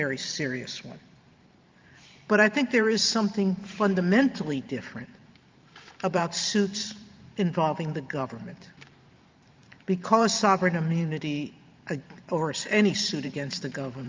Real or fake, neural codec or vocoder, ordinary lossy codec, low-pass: fake; vocoder, 44.1 kHz, 128 mel bands every 512 samples, BigVGAN v2; Opus, 24 kbps; 7.2 kHz